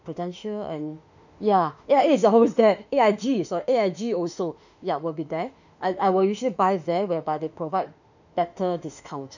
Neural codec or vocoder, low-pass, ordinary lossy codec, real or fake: autoencoder, 48 kHz, 32 numbers a frame, DAC-VAE, trained on Japanese speech; 7.2 kHz; none; fake